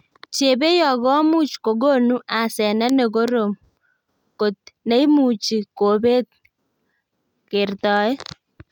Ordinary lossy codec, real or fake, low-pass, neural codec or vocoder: none; real; 19.8 kHz; none